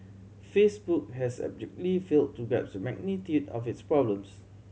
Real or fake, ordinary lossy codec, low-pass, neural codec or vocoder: real; none; none; none